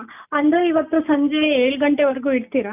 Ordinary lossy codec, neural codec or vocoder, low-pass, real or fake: none; none; 3.6 kHz; real